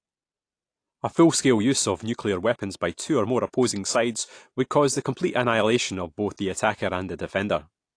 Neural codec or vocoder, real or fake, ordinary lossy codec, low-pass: none; real; AAC, 48 kbps; 9.9 kHz